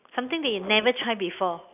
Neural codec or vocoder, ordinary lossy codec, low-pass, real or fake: none; none; 3.6 kHz; real